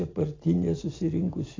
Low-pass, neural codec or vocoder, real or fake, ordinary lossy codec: 7.2 kHz; none; real; AAC, 32 kbps